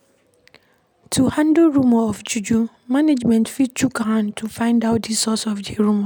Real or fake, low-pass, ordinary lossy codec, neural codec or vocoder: real; none; none; none